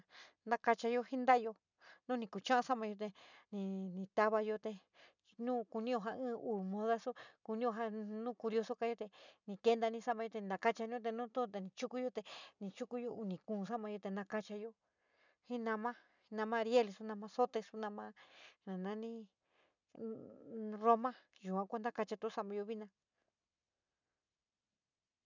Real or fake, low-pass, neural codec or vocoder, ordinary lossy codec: real; 7.2 kHz; none; none